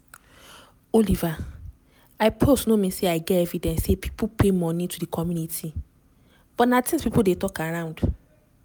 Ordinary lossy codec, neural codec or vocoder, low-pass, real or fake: none; none; none; real